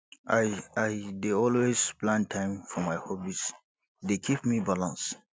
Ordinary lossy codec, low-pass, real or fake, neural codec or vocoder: none; none; real; none